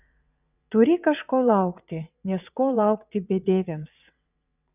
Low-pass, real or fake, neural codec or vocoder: 3.6 kHz; fake; vocoder, 22.05 kHz, 80 mel bands, WaveNeXt